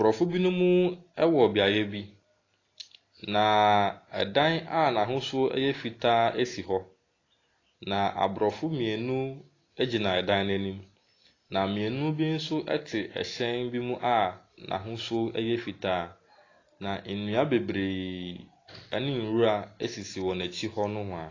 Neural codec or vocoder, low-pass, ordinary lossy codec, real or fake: none; 7.2 kHz; AAC, 32 kbps; real